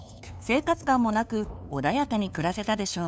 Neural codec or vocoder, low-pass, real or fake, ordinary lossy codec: codec, 16 kHz, 2 kbps, FunCodec, trained on LibriTTS, 25 frames a second; none; fake; none